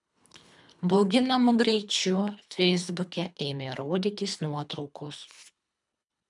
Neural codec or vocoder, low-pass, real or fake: codec, 24 kHz, 3 kbps, HILCodec; 10.8 kHz; fake